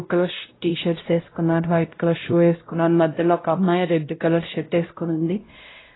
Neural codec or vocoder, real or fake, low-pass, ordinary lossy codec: codec, 16 kHz, 0.5 kbps, X-Codec, WavLM features, trained on Multilingual LibriSpeech; fake; 7.2 kHz; AAC, 16 kbps